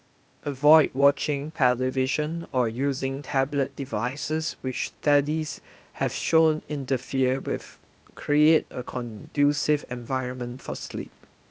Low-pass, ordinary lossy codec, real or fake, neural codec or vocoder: none; none; fake; codec, 16 kHz, 0.8 kbps, ZipCodec